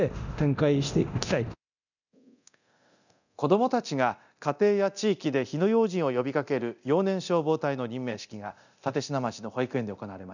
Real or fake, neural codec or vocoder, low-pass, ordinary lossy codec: fake; codec, 24 kHz, 0.9 kbps, DualCodec; 7.2 kHz; none